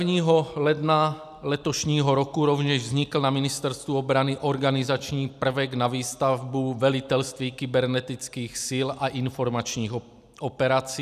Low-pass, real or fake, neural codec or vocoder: 14.4 kHz; real; none